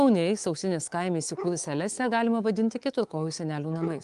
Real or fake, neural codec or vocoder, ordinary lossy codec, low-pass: fake; codec, 24 kHz, 3.1 kbps, DualCodec; Opus, 24 kbps; 10.8 kHz